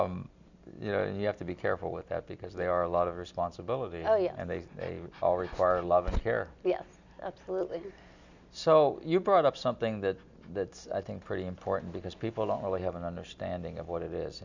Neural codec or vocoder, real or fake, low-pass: none; real; 7.2 kHz